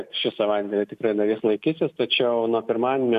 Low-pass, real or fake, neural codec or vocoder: 14.4 kHz; real; none